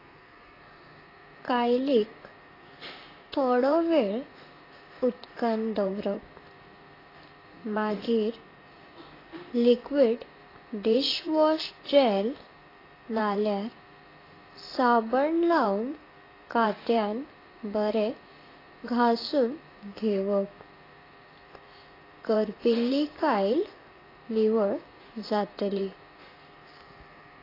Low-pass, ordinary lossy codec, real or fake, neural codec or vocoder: 5.4 kHz; AAC, 24 kbps; fake; vocoder, 44.1 kHz, 128 mel bands, Pupu-Vocoder